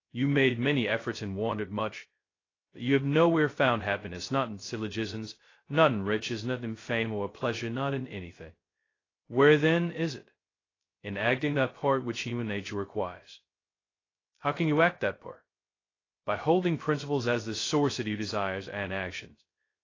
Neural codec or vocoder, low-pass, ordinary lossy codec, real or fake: codec, 16 kHz, 0.2 kbps, FocalCodec; 7.2 kHz; AAC, 32 kbps; fake